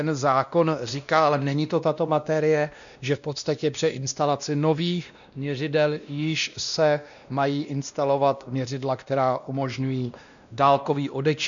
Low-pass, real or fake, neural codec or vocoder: 7.2 kHz; fake; codec, 16 kHz, 1 kbps, X-Codec, WavLM features, trained on Multilingual LibriSpeech